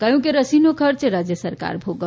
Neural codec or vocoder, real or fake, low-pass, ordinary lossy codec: none; real; none; none